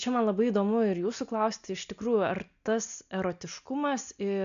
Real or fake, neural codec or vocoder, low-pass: real; none; 7.2 kHz